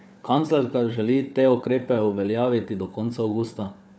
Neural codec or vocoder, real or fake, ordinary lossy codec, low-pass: codec, 16 kHz, 4 kbps, FunCodec, trained on Chinese and English, 50 frames a second; fake; none; none